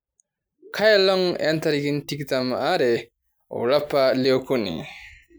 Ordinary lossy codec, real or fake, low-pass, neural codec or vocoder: none; real; none; none